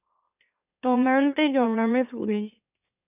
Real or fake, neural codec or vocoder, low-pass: fake; autoencoder, 44.1 kHz, a latent of 192 numbers a frame, MeloTTS; 3.6 kHz